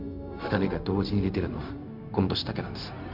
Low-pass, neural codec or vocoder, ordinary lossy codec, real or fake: 5.4 kHz; codec, 16 kHz, 0.4 kbps, LongCat-Audio-Codec; none; fake